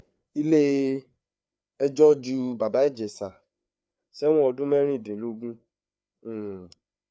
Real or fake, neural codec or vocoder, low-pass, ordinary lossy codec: fake; codec, 16 kHz, 4 kbps, FreqCodec, larger model; none; none